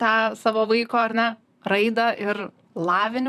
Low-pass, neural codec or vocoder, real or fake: 14.4 kHz; vocoder, 44.1 kHz, 128 mel bands, Pupu-Vocoder; fake